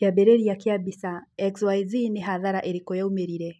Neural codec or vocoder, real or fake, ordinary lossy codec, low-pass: none; real; none; none